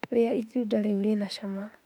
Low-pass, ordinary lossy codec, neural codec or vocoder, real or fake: 19.8 kHz; none; autoencoder, 48 kHz, 32 numbers a frame, DAC-VAE, trained on Japanese speech; fake